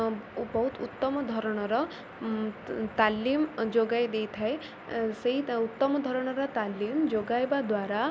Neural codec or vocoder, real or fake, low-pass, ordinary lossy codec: none; real; none; none